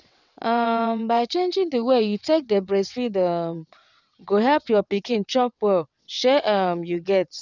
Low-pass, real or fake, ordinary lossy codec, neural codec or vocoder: 7.2 kHz; fake; none; vocoder, 22.05 kHz, 80 mel bands, WaveNeXt